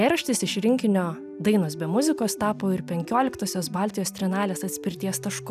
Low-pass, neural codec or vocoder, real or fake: 14.4 kHz; none; real